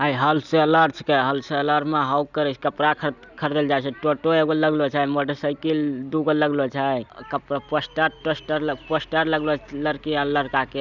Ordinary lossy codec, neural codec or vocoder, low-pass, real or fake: none; none; 7.2 kHz; real